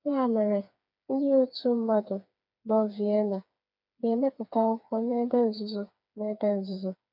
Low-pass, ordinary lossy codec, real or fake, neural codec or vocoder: 5.4 kHz; none; fake; codec, 16 kHz, 4 kbps, FreqCodec, smaller model